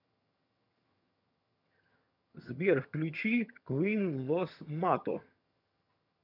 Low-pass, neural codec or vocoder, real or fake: 5.4 kHz; vocoder, 22.05 kHz, 80 mel bands, HiFi-GAN; fake